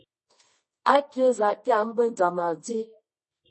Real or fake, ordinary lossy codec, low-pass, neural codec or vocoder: fake; MP3, 32 kbps; 10.8 kHz; codec, 24 kHz, 0.9 kbps, WavTokenizer, medium music audio release